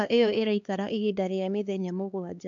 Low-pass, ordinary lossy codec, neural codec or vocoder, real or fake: 7.2 kHz; none; codec, 16 kHz, 2 kbps, X-Codec, HuBERT features, trained on LibriSpeech; fake